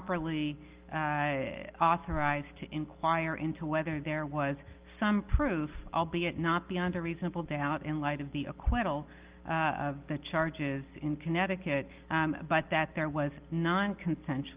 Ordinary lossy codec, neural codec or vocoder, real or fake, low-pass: Opus, 32 kbps; none; real; 3.6 kHz